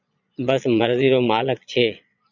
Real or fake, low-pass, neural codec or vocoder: fake; 7.2 kHz; vocoder, 22.05 kHz, 80 mel bands, Vocos